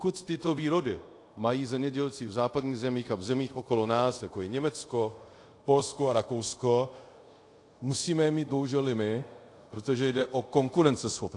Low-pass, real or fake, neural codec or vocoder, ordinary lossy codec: 10.8 kHz; fake; codec, 24 kHz, 0.5 kbps, DualCodec; AAC, 48 kbps